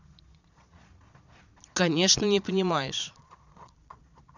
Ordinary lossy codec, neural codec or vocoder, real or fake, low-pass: none; vocoder, 22.05 kHz, 80 mel bands, Vocos; fake; 7.2 kHz